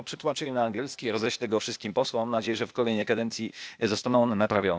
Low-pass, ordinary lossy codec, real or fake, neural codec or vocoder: none; none; fake; codec, 16 kHz, 0.8 kbps, ZipCodec